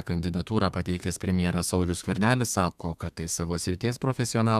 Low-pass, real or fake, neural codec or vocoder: 14.4 kHz; fake; codec, 32 kHz, 1.9 kbps, SNAC